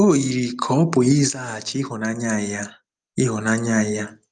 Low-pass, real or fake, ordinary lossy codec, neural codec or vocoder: 9.9 kHz; real; Opus, 32 kbps; none